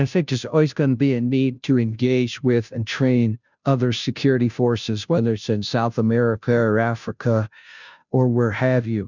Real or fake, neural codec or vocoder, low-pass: fake; codec, 16 kHz, 0.5 kbps, FunCodec, trained on Chinese and English, 25 frames a second; 7.2 kHz